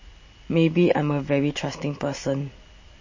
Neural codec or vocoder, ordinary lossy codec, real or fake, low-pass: none; MP3, 32 kbps; real; 7.2 kHz